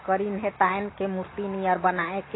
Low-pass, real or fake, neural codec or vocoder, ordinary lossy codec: 7.2 kHz; real; none; AAC, 16 kbps